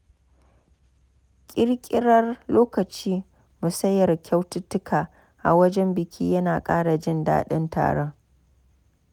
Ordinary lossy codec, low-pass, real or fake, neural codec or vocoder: none; 19.8 kHz; real; none